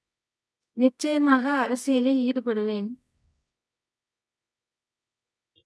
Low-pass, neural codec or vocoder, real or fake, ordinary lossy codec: none; codec, 24 kHz, 0.9 kbps, WavTokenizer, medium music audio release; fake; none